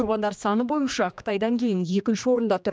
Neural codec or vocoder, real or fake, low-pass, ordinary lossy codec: codec, 16 kHz, 2 kbps, X-Codec, HuBERT features, trained on general audio; fake; none; none